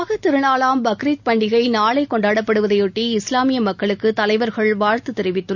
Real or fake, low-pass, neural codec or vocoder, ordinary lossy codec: real; 7.2 kHz; none; none